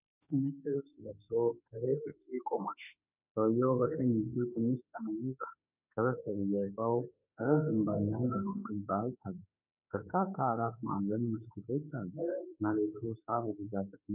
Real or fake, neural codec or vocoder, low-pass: fake; autoencoder, 48 kHz, 32 numbers a frame, DAC-VAE, trained on Japanese speech; 3.6 kHz